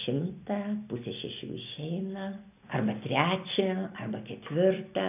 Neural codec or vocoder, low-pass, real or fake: none; 3.6 kHz; real